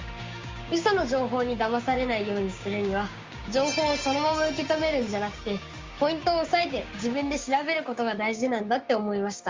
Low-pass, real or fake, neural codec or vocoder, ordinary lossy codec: 7.2 kHz; fake; codec, 16 kHz, 6 kbps, DAC; Opus, 32 kbps